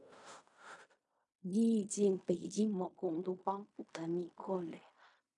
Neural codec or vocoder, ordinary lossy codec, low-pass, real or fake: codec, 16 kHz in and 24 kHz out, 0.4 kbps, LongCat-Audio-Codec, fine tuned four codebook decoder; AAC, 64 kbps; 10.8 kHz; fake